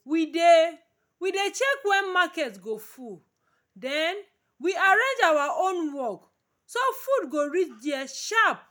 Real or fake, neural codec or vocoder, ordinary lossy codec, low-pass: real; none; none; none